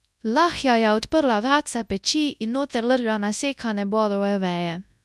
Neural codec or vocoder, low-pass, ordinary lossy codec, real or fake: codec, 24 kHz, 0.9 kbps, WavTokenizer, large speech release; none; none; fake